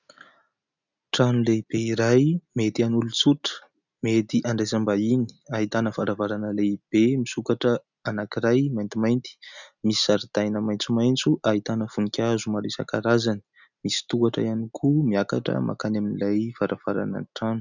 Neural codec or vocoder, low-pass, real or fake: none; 7.2 kHz; real